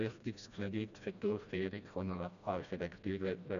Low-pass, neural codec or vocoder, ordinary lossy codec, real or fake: 7.2 kHz; codec, 16 kHz, 1 kbps, FreqCodec, smaller model; none; fake